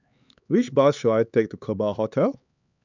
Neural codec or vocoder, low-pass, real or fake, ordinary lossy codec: codec, 16 kHz, 4 kbps, X-Codec, HuBERT features, trained on LibriSpeech; 7.2 kHz; fake; none